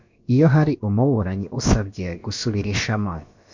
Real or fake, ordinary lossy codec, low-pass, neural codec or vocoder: fake; MP3, 48 kbps; 7.2 kHz; codec, 16 kHz, about 1 kbps, DyCAST, with the encoder's durations